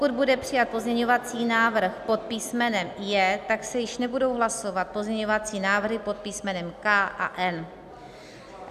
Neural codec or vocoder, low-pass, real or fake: none; 14.4 kHz; real